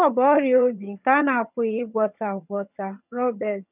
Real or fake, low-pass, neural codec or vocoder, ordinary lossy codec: fake; 3.6 kHz; vocoder, 22.05 kHz, 80 mel bands, HiFi-GAN; none